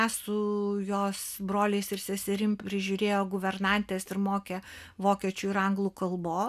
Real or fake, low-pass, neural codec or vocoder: real; 14.4 kHz; none